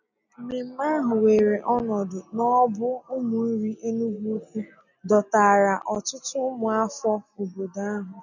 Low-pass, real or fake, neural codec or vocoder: 7.2 kHz; real; none